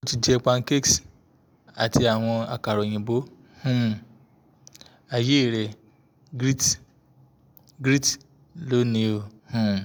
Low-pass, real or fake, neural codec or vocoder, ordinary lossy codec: none; real; none; none